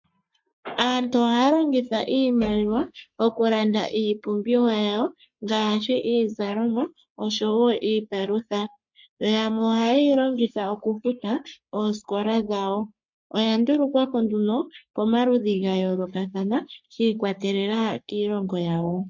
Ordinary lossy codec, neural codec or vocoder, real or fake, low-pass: MP3, 48 kbps; codec, 44.1 kHz, 3.4 kbps, Pupu-Codec; fake; 7.2 kHz